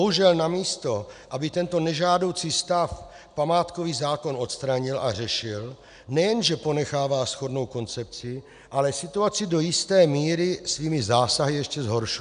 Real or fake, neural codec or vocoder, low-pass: real; none; 9.9 kHz